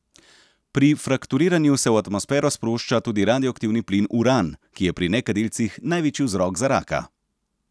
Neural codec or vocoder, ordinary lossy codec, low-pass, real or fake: none; none; none; real